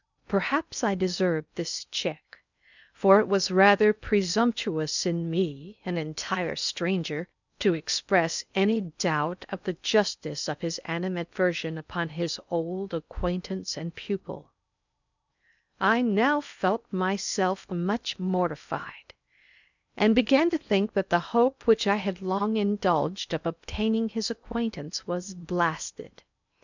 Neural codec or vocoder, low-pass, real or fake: codec, 16 kHz in and 24 kHz out, 0.8 kbps, FocalCodec, streaming, 65536 codes; 7.2 kHz; fake